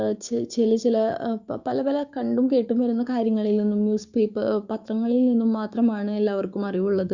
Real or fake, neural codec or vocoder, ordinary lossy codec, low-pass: fake; codec, 16 kHz, 6 kbps, DAC; Opus, 64 kbps; 7.2 kHz